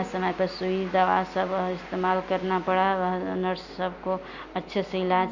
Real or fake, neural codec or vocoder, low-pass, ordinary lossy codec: real; none; 7.2 kHz; Opus, 64 kbps